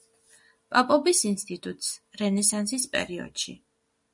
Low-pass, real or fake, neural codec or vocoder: 10.8 kHz; real; none